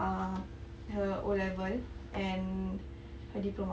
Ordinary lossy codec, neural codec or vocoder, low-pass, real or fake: none; none; none; real